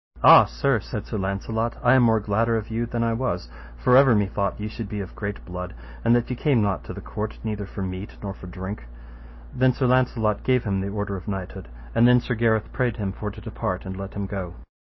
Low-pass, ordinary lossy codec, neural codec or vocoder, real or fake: 7.2 kHz; MP3, 24 kbps; none; real